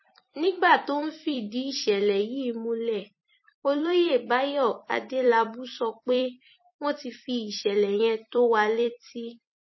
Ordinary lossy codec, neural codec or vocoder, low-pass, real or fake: MP3, 24 kbps; vocoder, 44.1 kHz, 128 mel bands every 256 samples, BigVGAN v2; 7.2 kHz; fake